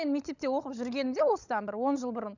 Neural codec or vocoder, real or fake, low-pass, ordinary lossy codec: codec, 16 kHz, 16 kbps, FunCodec, trained on LibriTTS, 50 frames a second; fake; 7.2 kHz; none